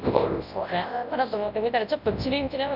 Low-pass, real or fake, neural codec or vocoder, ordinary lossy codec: 5.4 kHz; fake; codec, 24 kHz, 0.9 kbps, WavTokenizer, large speech release; none